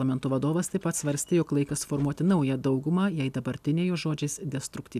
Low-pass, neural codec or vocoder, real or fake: 14.4 kHz; none; real